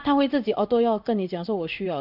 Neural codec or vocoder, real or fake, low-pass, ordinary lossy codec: codec, 16 kHz in and 24 kHz out, 1 kbps, XY-Tokenizer; fake; 5.4 kHz; none